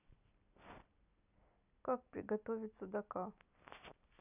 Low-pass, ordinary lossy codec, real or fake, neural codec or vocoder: 3.6 kHz; none; real; none